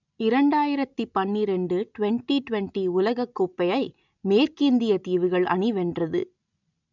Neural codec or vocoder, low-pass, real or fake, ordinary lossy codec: none; 7.2 kHz; real; none